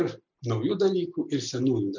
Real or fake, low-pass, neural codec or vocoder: real; 7.2 kHz; none